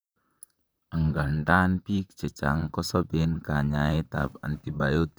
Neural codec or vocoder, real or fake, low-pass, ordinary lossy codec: vocoder, 44.1 kHz, 128 mel bands, Pupu-Vocoder; fake; none; none